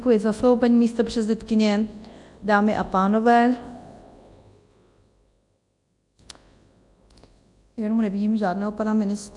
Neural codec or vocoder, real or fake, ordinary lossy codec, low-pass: codec, 24 kHz, 0.9 kbps, WavTokenizer, large speech release; fake; AAC, 64 kbps; 10.8 kHz